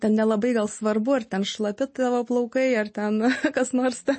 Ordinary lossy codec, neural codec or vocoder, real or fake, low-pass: MP3, 32 kbps; none; real; 9.9 kHz